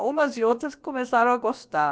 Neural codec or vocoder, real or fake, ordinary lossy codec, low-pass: codec, 16 kHz, about 1 kbps, DyCAST, with the encoder's durations; fake; none; none